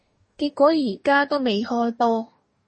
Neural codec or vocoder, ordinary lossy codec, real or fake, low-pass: codec, 44.1 kHz, 2.6 kbps, DAC; MP3, 32 kbps; fake; 10.8 kHz